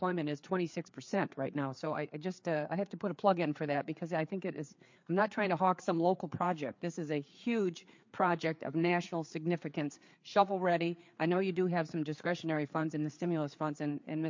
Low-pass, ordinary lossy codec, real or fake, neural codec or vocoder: 7.2 kHz; MP3, 48 kbps; fake; codec, 16 kHz, 4 kbps, FreqCodec, larger model